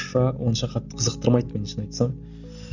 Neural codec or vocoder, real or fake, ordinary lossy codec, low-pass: none; real; none; 7.2 kHz